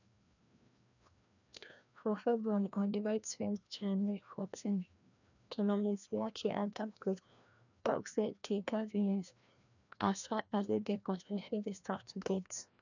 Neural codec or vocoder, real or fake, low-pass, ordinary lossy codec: codec, 16 kHz, 1 kbps, FreqCodec, larger model; fake; 7.2 kHz; none